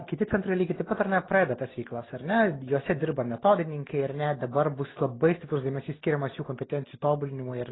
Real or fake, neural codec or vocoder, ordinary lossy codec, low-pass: real; none; AAC, 16 kbps; 7.2 kHz